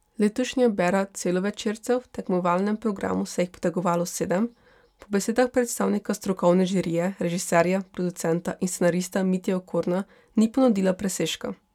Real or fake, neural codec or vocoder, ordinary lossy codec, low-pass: real; none; none; 19.8 kHz